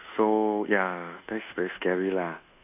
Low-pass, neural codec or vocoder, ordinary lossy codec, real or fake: 3.6 kHz; codec, 24 kHz, 1.2 kbps, DualCodec; none; fake